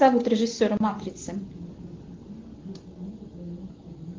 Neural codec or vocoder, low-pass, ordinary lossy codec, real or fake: none; 7.2 kHz; Opus, 16 kbps; real